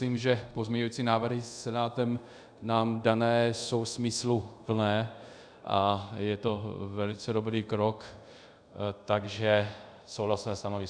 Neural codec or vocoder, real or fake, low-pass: codec, 24 kHz, 0.5 kbps, DualCodec; fake; 9.9 kHz